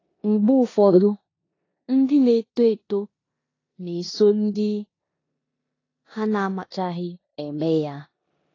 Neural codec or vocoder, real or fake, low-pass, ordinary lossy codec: codec, 16 kHz in and 24 kHz out, 0.9 kbps, LongCat-Audio-Codec, four codebook decoder; fake; 7.2 kHz; AAC, 32 kbps